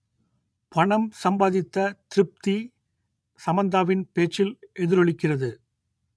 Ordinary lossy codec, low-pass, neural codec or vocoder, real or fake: none; none; vocoder, 22.05 kHz, 80 mel bands, Vocos; fake